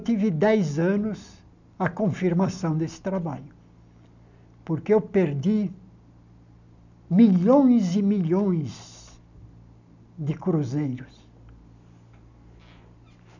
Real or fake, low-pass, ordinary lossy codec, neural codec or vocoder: real; 7.2 kHz; none; none